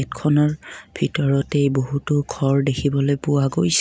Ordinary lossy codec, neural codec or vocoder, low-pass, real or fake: none; none; none; real